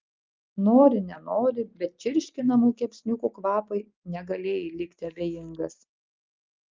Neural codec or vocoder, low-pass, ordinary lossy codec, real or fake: none; 7.2 kHz; Opus, 24 kbps; real